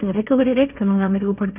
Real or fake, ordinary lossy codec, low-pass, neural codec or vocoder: fake; none; 3.6 kHz; codec, 16 kHz, 1.1 kbps, Voila-Tokenizer